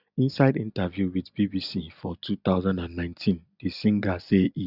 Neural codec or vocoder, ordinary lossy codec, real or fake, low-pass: none; none; real; 5.4 kHz